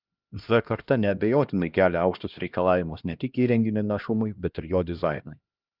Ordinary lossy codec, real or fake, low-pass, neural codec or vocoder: Opus, 24 kbps; fake; 5.4 kHz; codec, 16 kHz, 1 kbps, X-Codec, HuBERT features, trained on LibriSpeech